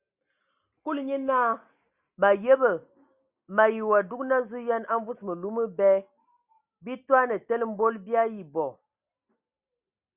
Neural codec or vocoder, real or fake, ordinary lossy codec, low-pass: none; real; Opus, 64 kbps; 3.6 kHz